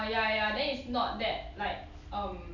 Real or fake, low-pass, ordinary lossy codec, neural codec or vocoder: real; 7.2 kHz; none; none